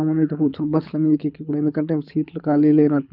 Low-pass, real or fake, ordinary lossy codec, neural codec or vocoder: 5.4 kHz; fake; none; codec, 24 kHz, 6 kbps, HILCodec